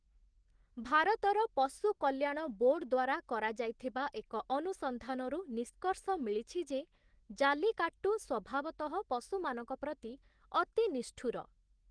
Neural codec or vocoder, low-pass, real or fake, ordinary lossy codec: autoencoder, 48 kHz, 128 numbers a frame, DAC-VAE, trained on Japanese speech; 9.9 kHz; fake; Opus, 16 kbps